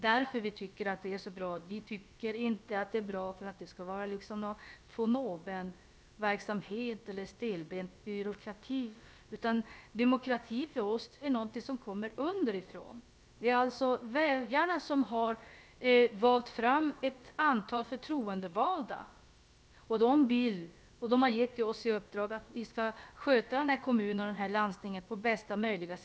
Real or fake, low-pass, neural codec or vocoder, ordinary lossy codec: fake; none; codec, 16 kHz, about 1 kbps, DyCAST, with the encoder's durations; none